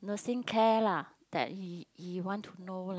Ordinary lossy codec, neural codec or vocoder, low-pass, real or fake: none; none; none; real